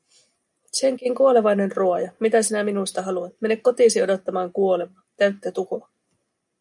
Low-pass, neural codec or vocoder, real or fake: 10.8 kHz; none; real